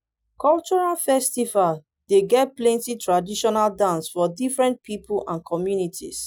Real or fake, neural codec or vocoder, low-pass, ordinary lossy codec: real; none; none; none